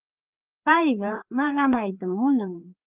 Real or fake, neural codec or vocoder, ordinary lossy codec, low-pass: fake; codec, 16 kHz, 2 kbps, FreqCodec, larger model; Opus, 32 kbps; 3.6 kHz